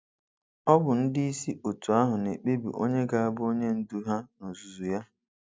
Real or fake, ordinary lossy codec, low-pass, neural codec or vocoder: real; none; none; none